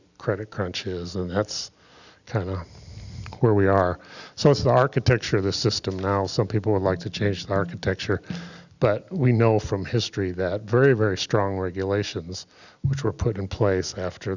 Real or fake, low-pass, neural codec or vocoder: real; 7.2 kHz; none